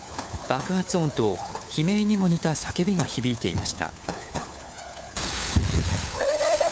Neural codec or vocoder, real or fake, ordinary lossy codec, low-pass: codec, 16 kHz, 4 kbps, FunCodec, trained on LibriTTS, 50 frames a second; fake; none; none